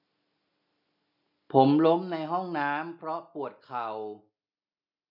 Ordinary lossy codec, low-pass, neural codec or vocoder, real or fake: none; 5.4 kHz; none; real